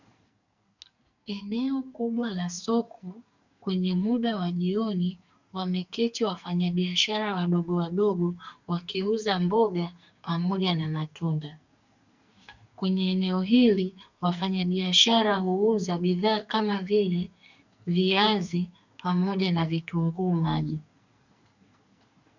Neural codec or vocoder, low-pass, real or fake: codec, 44.1 kHz, 2.6 kbps, SNAC; 7.2 kHz; fake